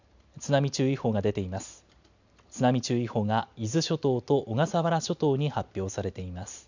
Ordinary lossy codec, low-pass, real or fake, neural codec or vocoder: none; 7.2 kHz; real; none